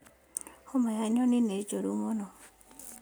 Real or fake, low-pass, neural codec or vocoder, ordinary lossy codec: real; none; none; none